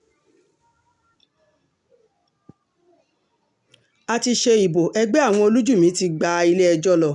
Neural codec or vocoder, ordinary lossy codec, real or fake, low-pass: none; none; real; 10.8 kHz